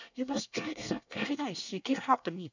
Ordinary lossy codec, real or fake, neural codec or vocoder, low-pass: none; fake; codec, 24 kHz, 1 kbps, SNAC; 7.2 kHz